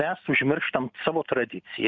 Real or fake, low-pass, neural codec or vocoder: real; 7.2 kHz; none